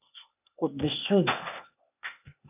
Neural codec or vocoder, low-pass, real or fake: codec, 16 kHz, 0.8 kbps, ZipCodec; 3.6 kHz; fake